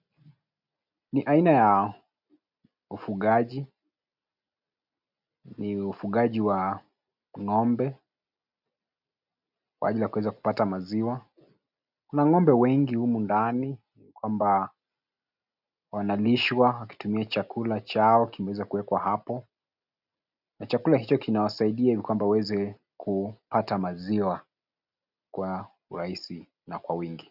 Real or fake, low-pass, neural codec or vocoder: real; 5.4 kHz; none